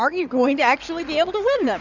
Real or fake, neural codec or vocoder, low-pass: fake; codec, 16 kHz in and 24 kHz out, 2.2 kbps, FireRedTTS-2 codec; 7.2 kHz